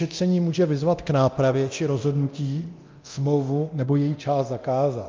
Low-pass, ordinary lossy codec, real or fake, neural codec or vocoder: 7.2 kHz; Opus, 24 kbps; fake; codec, 24 kHz, 0.9 kbps, DualCodec